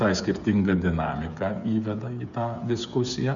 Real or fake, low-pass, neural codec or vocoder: fake; 7.2 kHz; codec, 16 kHz, 8 kbps, FreqCodec, smaller model